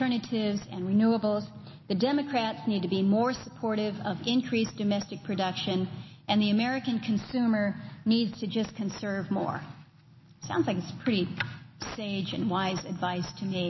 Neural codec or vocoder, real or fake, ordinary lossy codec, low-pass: none; real; MP3, 24 kbps; 7.2 kHz